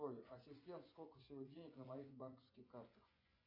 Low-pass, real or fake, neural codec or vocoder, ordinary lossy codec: 5.4 kHz; fake; vocoder, 24 kHz, 100 mel bands, Vocos; MP3, 48 kbps